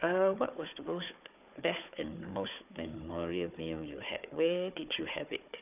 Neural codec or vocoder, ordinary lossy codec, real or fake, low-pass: codec, 16 kHz, 4 kbps, FunCodec, trained on Chinese and English, 50 frames a second; none; fake; 3.6 kHz